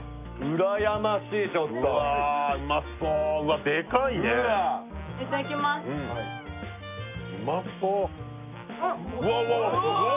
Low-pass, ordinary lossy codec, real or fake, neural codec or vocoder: 3.6 kHz; none; real; none